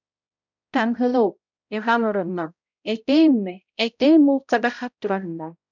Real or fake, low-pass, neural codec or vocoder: fake; 7.2 kHz; codec, 16 kHz, 0.5 kbps, X-Codec, HuBERT features, trained on balanced general audio